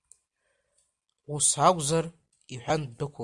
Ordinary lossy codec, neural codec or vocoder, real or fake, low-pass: Opus, 64 kbps; none; real; 10.8 kHz